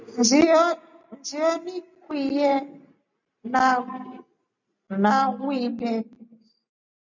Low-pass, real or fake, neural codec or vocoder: 7.2 kHz; real; none